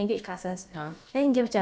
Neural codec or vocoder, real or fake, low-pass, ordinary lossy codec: codec, 16 kHz, about 1 kbps, DyCAST, with the encoder's durations; fake; none; none